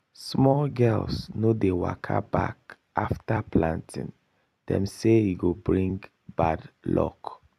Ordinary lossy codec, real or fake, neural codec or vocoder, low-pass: AAC, 96 kbps; fake; vocoder, 44.1 kHz, 128 mel bands every 256 samples, BigVGAN v2; 14.4 kHz